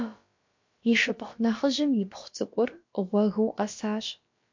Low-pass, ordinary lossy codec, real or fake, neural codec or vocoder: 7.2 kHz; MP3, 48 kbps; fake; codec, 16 kHz, about 1 kbps, DyCAST, with the encoder's durations